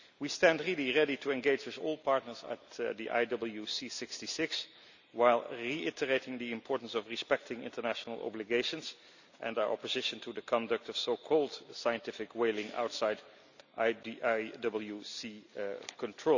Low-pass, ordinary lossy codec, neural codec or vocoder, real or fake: 7.2 kHz; none; none; real